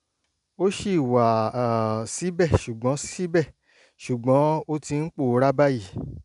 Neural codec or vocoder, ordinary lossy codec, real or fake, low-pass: none; none; real; 10.8 kHz